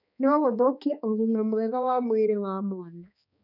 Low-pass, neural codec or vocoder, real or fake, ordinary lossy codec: 5.4 kHz; codec, 16 kHz, 2 kbps, X-Codec, HuBERT features, trained on balanced general audio; fake; none